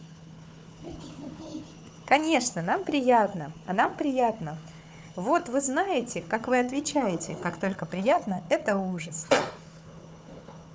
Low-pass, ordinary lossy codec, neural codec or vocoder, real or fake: none; none; codec, 16 kHz, 4 kbps, FunCodec, trained on Chinese and English, 50 frames a second; fake